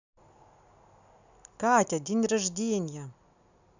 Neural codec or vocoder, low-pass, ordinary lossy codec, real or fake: none; 7.2 kHz; none; real